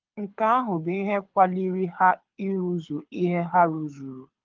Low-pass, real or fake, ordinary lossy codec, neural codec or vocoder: 7.2 kHz; fake; Opus, 32 kbps; codec, 24 kHz, 6 kbps, HILCodec